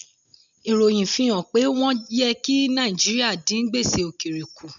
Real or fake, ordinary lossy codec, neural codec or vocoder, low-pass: real; none; none; 7.2 kHz